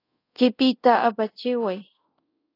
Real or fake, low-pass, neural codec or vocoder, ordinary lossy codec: fake; 5.4 kHz; codec, 24 kHz, 0.5 kbps, DualCodec; AAC, 24 kbps